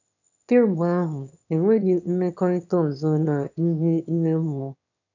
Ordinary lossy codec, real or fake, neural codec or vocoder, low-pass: none; fake; autoencoder, 22.05 kHz, a latent of 192 numbers a frame, VITS, trained on one speaker; 7.2 kHz